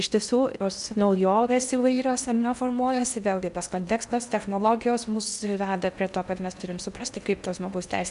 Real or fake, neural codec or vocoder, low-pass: fake; codec, 16 kHz in and 24 kHz out, 0.6 kbps, FocalCodec, streaming, 2048 codes; 10.8 kHz